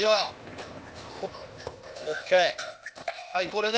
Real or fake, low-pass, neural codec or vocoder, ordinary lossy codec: fake; none; codec, 16 kHz, 0.8 kbps, ZipCodec; none